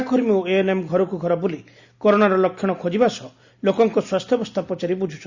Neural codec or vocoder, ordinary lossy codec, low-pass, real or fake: none; Opus, 64 kbps; 7.2 kHz; real